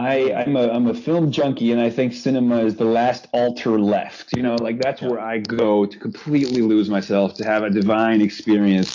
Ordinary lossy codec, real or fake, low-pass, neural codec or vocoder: AAC, 48 kbps; real; 7.2 kHz; none